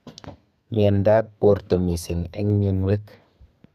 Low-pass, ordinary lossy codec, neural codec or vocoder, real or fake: 14.4 kHz; none; codec, 32 kHz, 1.9 kbps, SNAC; fake